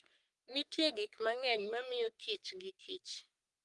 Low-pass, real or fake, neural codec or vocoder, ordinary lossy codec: 10.8 kHz; fake; codec, 44.1 kHz, 3.4 kbps, Pupu-Codec; Opus, 24 kbps